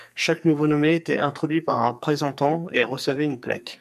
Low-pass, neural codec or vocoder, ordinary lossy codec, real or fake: 14.4 kHz; codec, 44.1 kHz, 2.6 kbps, SNAC; MP3, 96 kbps; fake